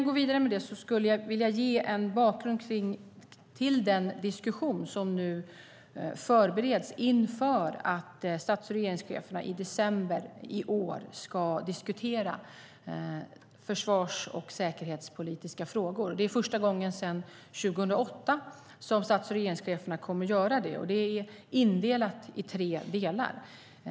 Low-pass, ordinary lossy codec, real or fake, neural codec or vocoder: none; none; real; none